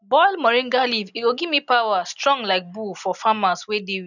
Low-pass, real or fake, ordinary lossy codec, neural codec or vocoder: 7.2 kHz; real; none; none